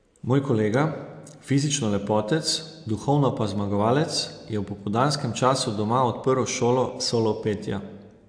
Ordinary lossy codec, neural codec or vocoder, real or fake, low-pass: AAC, 64 kbps; none; real; 9.9 kHz